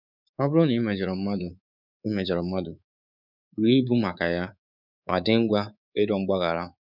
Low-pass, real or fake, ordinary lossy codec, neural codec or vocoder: 5.4 kHz; fake; none; codec, 24 kHz, 3.1 kbps, DualCodec